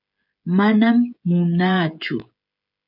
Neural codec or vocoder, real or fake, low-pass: codec, 16 kHz, 16 kbps, FreqCodec, smaller model; fake; 5.4 kHz